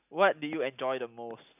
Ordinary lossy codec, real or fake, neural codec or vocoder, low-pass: none; real; none; 3.6 kHz